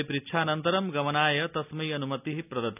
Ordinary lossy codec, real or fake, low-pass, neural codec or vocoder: none; real; 3.6 kHz; none